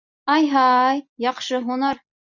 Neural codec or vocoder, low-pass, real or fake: none; 7.2 kHz; real